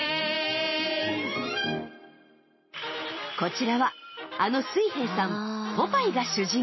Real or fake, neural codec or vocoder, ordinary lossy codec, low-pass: real; none; MP3, 24 kbps; 7.2 kHz